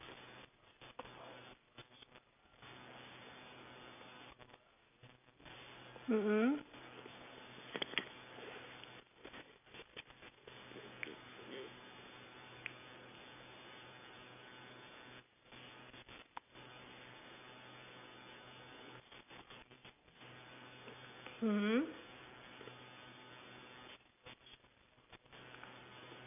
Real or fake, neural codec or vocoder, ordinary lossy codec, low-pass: real; none; none; 3.6 kHz